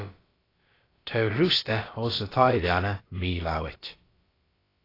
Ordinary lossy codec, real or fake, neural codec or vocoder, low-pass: AAC, 24 kbps; fake; codec, 16 kHz, about 1 kbps, DyCAST, with the encoder's durations; 5.4 kHz